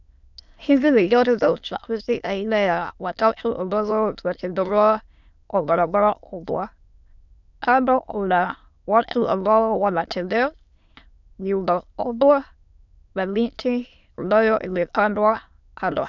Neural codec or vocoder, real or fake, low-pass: autoencoder, 22.05 kHz, a latent of 192 numbers a frame, VITS, trained on many speakers; fake; 7.2 kHz